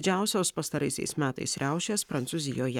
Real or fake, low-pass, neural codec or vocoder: fake; 19.8 kHz; codec, 44.1 kHz, 7.8 kbps, Pupu-Codec